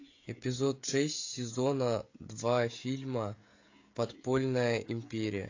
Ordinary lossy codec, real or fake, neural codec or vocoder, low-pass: AAC, 48 kbps; fake; codec, 16 kHz, 16 kbps, FreqCodec, smaller model; 7.2 kHz